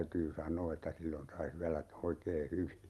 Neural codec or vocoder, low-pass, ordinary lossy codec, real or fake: none; 19.8 kHz; Opus, 32 kbps; real